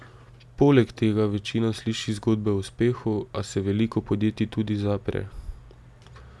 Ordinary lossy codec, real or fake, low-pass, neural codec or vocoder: none; real; none; none